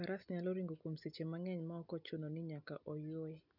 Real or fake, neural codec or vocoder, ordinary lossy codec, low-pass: real; none; none; 5.4 kHz